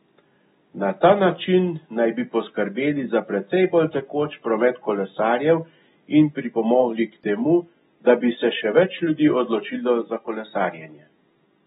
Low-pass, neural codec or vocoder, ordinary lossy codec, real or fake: 7.2 kHz; none; AAC, 16 kbps; real